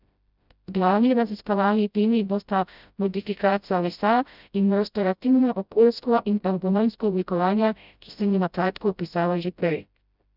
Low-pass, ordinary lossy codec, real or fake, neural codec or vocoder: 5.4 kHz; none; fake; codec, 16 kHz, 0.5 kbps, FreqCodec, smaller model